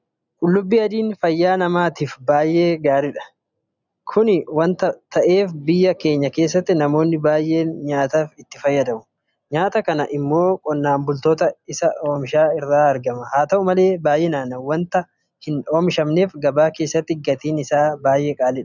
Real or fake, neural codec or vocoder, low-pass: real; none; 7.2 kHz